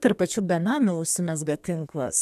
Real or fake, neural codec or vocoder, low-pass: fake; codec, 32 kHz, 1.9 kbps, SNAC; 14.4 kHz